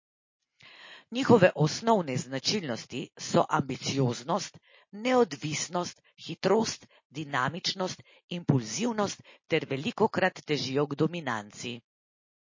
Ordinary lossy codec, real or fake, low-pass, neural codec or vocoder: MP3, 32 kbps; real; 7.2 kHz; none